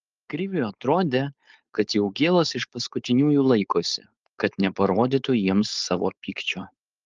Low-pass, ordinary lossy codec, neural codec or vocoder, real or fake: 7.2 kHz; Opus, 24 kbps; codec, 16 kHz, 4.8 kbps, FACodec; fake